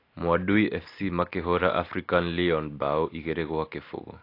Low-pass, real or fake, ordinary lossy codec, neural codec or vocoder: 5.4 kHz; real; AAC, 32 kbps; none